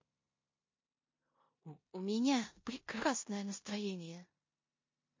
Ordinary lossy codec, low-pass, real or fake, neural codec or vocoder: MP3, 32 kbps; 7.2 kHz; fake; codec, 16 kHz in and 24 kHz out, 0.9 kbps, LongCat-Audio-Codec, four codebook decoder